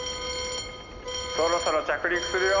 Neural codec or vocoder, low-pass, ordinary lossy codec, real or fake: none; 7.2 kHz; none; real